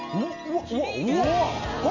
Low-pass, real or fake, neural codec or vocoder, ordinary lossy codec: 7.2 kHz; real; none; none